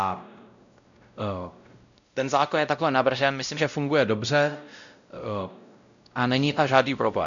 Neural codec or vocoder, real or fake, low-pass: codec, 16 kHz, 0.5 kbps, X-Codec, WavLM features, trained on Multilingual LibriSpeech; fake; 7.2 kHz